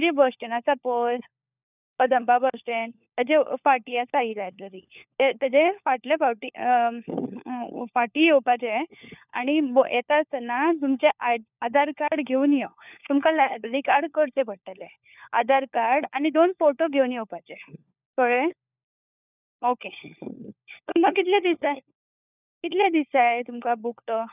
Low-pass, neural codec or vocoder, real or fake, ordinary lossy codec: 3.6 kHz; codec, 16 kHz, 4 kbps, FunCodec, trained on LibriTTS, 50 frames a second; fake; none